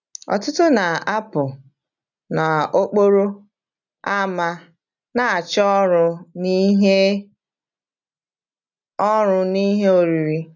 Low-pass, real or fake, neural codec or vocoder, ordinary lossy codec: 7.2 kHz; real; none; none